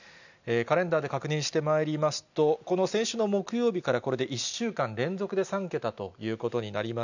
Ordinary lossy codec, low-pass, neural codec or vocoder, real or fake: none; 7.2 kHz; none; real